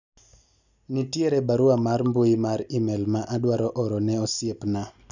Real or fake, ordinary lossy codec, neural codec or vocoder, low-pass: real; none; none; 7.2 kHz